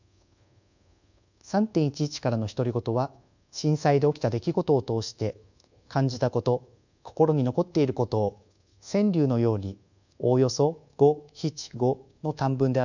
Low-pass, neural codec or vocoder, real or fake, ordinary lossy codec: 7.2 kHz; codec, 24 kHz, 1.2 kbps, DualCodec; fake; none